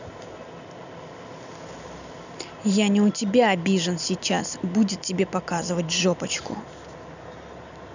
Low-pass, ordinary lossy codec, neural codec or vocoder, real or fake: 7.2 kHz; none; none; real